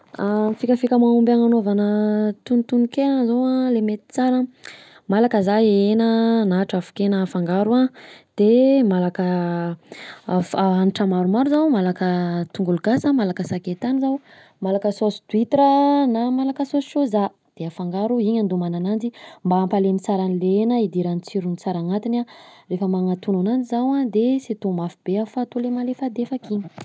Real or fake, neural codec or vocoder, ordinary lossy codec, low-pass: real; none; none; none